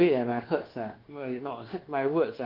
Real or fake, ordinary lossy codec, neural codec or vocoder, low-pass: fake; Opus, 16 kbps; codec, 24 kHz, 1.2 kbps, DualCodec; 5.4 kHz